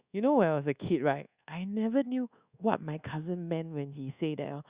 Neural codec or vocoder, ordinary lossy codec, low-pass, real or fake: codec, 24 kHz, 1.2 kbps, DualCodec; Opus, 64 kbps; 3.6 kHz; fake